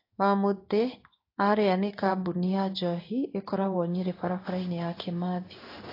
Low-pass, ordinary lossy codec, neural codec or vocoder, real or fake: 5.4 kHz; AAC, 32 kbps; codec, 16 kHz in and 24 kHz out, 1 kbps, XY-Tokenizer; fake